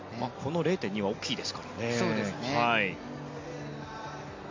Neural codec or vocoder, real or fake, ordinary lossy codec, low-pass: none; real; MP3, 48 kbps; 7.2 kHz